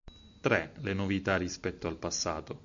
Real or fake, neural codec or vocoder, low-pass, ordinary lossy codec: real; none; 7.2 kHz; MP3, 64 kbps